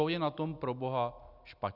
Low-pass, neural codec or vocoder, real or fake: 5.4 kHz; none; real